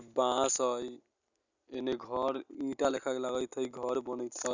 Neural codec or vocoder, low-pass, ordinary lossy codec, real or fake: none; 7.2 kHz; none; real